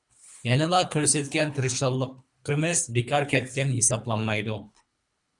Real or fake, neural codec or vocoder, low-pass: fake; codec, 24 kHz, 3 kbps, HILCodec; 10.8 kHz